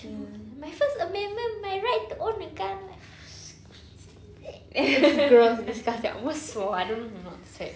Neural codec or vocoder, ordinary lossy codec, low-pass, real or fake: none; none; none; real